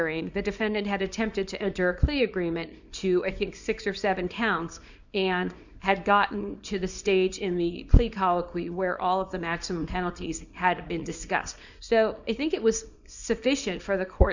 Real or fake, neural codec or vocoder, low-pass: fake; codec, 24 kHz, 0.9 kbps, WavTokenizer, small release; 7.2 kHz